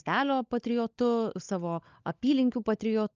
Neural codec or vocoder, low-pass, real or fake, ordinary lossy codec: codec, 16 kHz, 16 kbps, FunCodec, trained on LibriTTS, 50 frames a second; 7.2 kHz; fake; Opus, 24 kbps